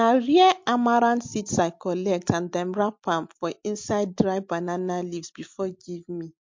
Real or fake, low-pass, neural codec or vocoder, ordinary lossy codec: real; 7.2 kHz; none; MP3, 48 kbps